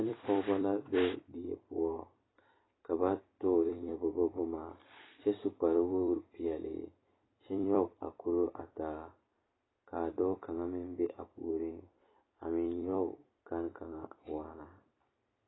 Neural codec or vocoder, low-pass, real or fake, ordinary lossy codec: vocoder, 44.1 kHz, 128 mel bands every 256 samples, BigVGAN v2; 7.2 kHz; fake; AAC, 16 kbps